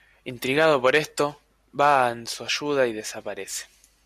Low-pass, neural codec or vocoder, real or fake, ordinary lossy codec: 14.4 kHz; none; real; AAC, 96 kbps